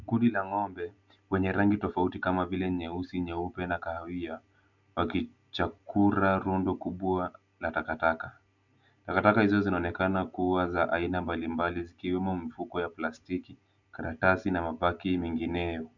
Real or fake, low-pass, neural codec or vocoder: real; 7.2 kHz; none